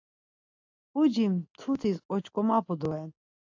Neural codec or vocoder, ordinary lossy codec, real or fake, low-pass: none; AAC, 48 kbps; real; 7.2 kHz